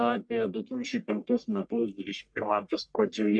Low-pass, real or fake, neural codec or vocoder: 9.9 kHz; fake; codec, 44.1 kHz, 1.7 kbps, Pupu-Codec